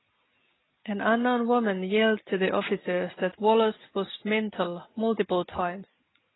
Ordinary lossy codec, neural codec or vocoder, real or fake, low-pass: AAC, 16 kbps; none; real; 7.2 kHz